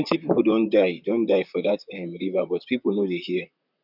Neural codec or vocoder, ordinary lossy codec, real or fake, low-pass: vocoder, 44.1 kHz, 128 mel bands, Pupu-Vocoder; none; fake; 5.4 kHz